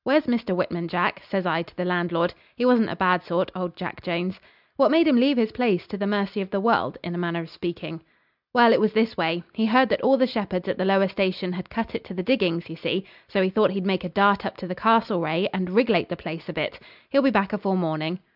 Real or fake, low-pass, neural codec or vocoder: real; 5.4 kHz; none